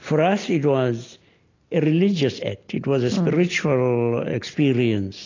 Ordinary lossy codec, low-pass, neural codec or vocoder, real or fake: AAC, 32 kbps; 7.2 kHz; none; real